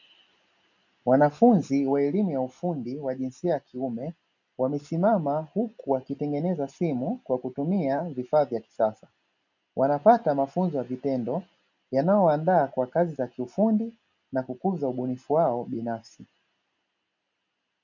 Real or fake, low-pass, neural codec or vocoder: real; 7.2 kHz; none